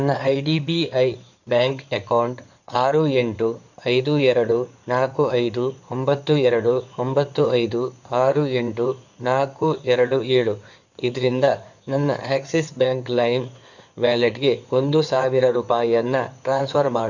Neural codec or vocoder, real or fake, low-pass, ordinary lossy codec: codec, 16 kHz in and 24 kHz out, 2.2 kbps, FireRedTTS-2 codec; fake; 7.2 kHz; none